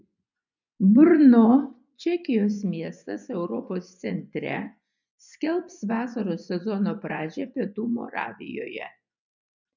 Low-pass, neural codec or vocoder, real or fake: 7.2 kHz; vocoder, 24 kHz, 100 mel bands, Vocos; fake